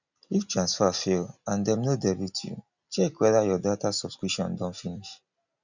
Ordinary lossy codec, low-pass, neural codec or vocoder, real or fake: none; 7.2 kHz; none; real